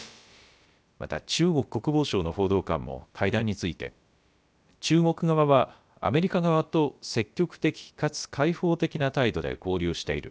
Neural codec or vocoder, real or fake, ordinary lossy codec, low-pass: codec, 16 kHz, about 1 kbps, DyCAST, with the encoder's durations; fake; none; none